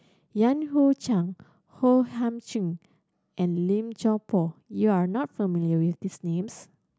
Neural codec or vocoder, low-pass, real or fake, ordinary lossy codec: none; none; real; none